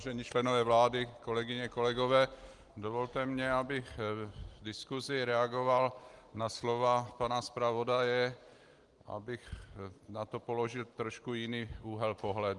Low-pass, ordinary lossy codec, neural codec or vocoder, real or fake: 10.8 kHz; Opus, 24 kbps; none; real